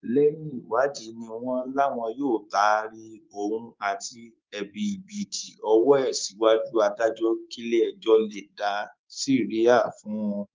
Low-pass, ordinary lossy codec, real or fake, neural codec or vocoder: 7.2 kHz; Opus, 32 kbps; fake; codec, 24 kHz, 3.1 kbps, DualCodec